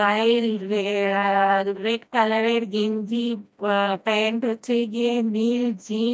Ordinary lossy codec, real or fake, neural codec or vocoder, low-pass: none; fake; codec, 16 kHz, 1 kbps, FreqCodec, smaller model; none